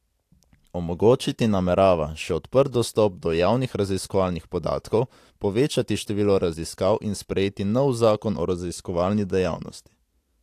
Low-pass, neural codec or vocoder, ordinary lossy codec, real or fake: 14.4 kHz; none; AAC, 64 kbps; real